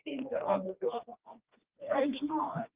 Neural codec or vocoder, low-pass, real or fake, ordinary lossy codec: codec, 16 kHz, 2 kbps, FreqCodec, smaller model; 3.6 kHz; fake; Opus, 32 kbps